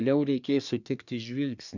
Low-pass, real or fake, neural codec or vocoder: 7.2 kHz; fake; codec, 24 kHz, 1 kbps, SNAC